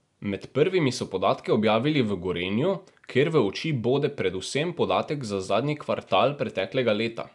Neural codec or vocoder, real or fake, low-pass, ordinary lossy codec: none; real; 10.8 kHz; none